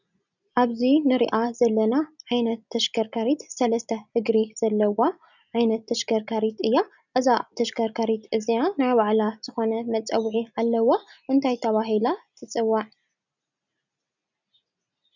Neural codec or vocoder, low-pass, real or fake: none; 7.2 kHz; real